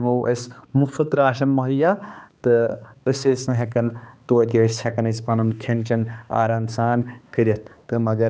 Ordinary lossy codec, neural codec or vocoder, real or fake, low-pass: none; codec, 16 kHz, 2 kbps, X-Codec, HuBERT features, trained on balanced general audio; fake; none